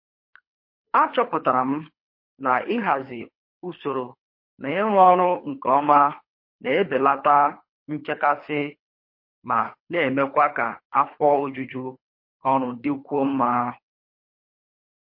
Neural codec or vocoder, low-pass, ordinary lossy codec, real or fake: codec, 24 kHz, 3 kbps, HILCodec; 5.4 kHz; MP3, 32 kbps; fake